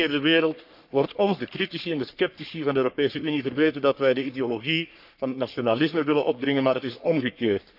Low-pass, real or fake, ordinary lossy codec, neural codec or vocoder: 5.4 kHz; fake; none; codec, 44.1 kHz, 3.4 kbps, Pupu-Codec